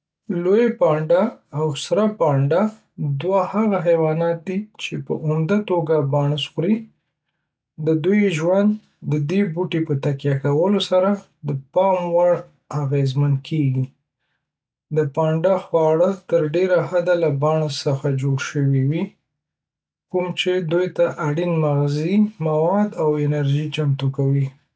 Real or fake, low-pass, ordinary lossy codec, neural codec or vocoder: real; none; none; none